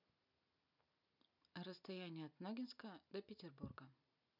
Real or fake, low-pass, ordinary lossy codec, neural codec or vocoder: real; 5.4 kHz; none; none